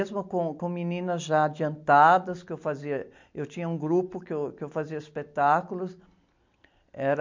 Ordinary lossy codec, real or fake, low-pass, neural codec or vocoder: MP3, 48 kbps; real; 7.2 kHz; none